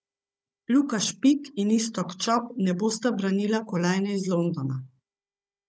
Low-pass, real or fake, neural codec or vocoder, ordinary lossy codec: none; fake; codec, 16 kHz, 16 kbps, FunCodec, trained on Chinese and English, 50 frames a second; none